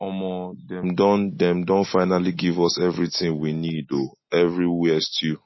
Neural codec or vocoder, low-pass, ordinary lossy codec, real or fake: none; 7.2 kHz; MP3, 24 kbps; real